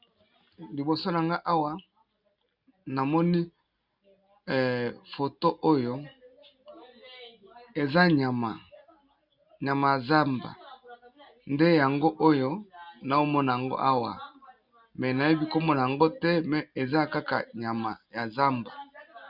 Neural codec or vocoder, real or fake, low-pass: none; real; 5.4 kHz